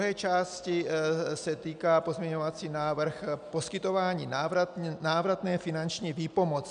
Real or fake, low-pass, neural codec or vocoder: real; 9.9 kHz; none